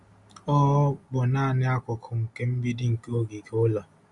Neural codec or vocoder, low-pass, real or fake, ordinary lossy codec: none; 10.8 kHz; real; Opus, 64 kbps